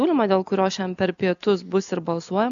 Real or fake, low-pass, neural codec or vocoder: real; 7.2 kHz; none